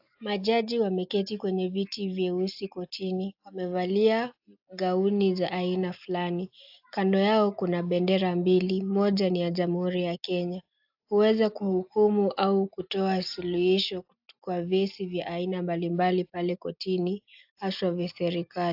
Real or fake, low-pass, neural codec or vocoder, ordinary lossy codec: real; 5.4 kHz; none; AAC, 48 kbps